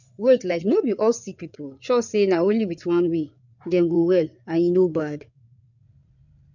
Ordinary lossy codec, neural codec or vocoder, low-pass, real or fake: none; codec, 16 kHz in and 24 kHz out, 2.2 kbps, FireRedTTS-2 codec; 7.2 kHz; fake